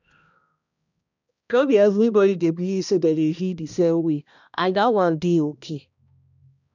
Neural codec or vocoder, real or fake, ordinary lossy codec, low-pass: codec, 16 kHz, 1 kbps, X-Codec, HuBERT features, trained on balanced general audio; fake; none; 7.2 kHz